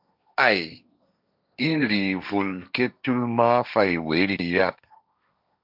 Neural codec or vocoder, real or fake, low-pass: codec, 16 kHz, 1.1 kbps, Voila-Tokenizer; fake; 5.4 kHz